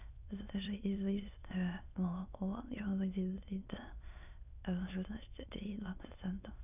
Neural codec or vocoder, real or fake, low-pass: autoencoder, 22.05 kHz, a latent of 192 numbers a frame, VITS, trained on many speakers; fake; 3.6 kHz